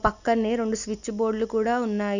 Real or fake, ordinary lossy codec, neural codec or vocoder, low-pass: real; none; none; 7.2 kHz